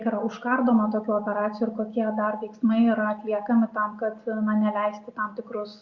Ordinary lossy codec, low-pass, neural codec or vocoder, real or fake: Opus, 64 kbps; 7.2 kHz; none; real